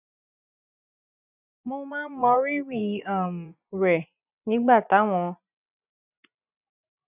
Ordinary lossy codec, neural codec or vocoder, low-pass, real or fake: none; vocoder, 24 kHz, 100 mel bands, Vocos; 3.6 kHz; fake